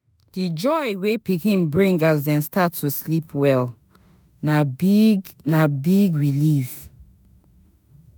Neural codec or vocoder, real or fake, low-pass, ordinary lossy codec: autoencoder, 48 kHz, 32 numbers a frame, DAC-VAE, trained on Japanese speech; fake; none; none